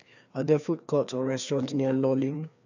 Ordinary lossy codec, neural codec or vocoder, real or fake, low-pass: none; codec, 16 kHz, 2 kbps, FreqCodec, larger model; fake; 7.2 kHz